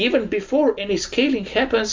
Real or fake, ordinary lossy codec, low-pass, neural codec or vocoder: real; MP3, 64 kbps; 7.2 kHz; none